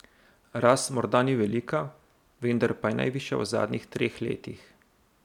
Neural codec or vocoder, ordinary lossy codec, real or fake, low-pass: none; none; real; 19.8 kHz